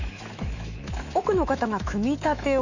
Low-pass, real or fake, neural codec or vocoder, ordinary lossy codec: 7.2 kHz; fake; autoencoder, 48 kHz, 128 numbers a frame, DAC-VAE, trained on Japanese speech; none